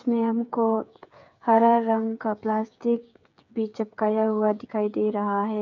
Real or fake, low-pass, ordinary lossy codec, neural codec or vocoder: fake; 7.2 kHz; none; codec, 16 kHz, 8 kbps, FreqCodec, smaller model